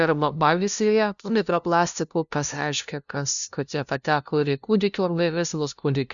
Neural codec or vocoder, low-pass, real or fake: codec, 16 kHz, 0.5 kbps, FunCodec, trained on LibriTTS, 25 frames a second; 7.2 kHz; fake